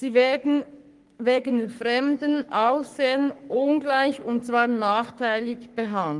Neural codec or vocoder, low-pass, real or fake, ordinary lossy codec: codec, 44.1 kHz, 3.4 kbps, Pupu-Codec; 10.8 kHz; fake; Opus, 24 kbps